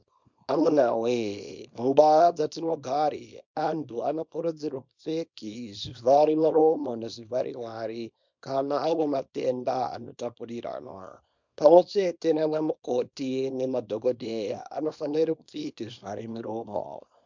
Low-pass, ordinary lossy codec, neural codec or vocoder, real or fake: 7.2 kHz; MP3, 64 kbps; codec, 24 kHz, 0.9 kbps, WavTokenizer, small release; fake